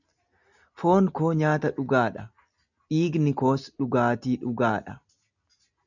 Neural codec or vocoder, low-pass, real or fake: none; 7.2 kHz; real